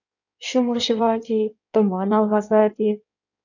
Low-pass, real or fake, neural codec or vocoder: 7.2 kHz; fake; codec, 16 kHz in and 24 kHz out, 1.1 kbps, FireRedTTS-2 codec